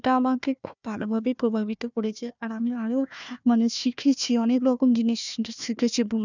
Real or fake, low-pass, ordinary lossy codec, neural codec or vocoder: fake; 7.2 kHz; none; codec, 16 kHz, 1 kbps, FunCodec, trained on Chinese and English, 50 frames a second